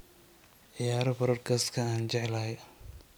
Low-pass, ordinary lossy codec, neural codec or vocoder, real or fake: none; none; none; real